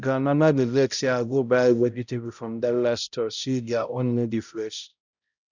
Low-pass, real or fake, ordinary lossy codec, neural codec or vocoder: 7.2 kHz; fake; none; codec, 16 kHz, 0.5 kbps, X-Codec, HuBERT features, trained on balanced general audio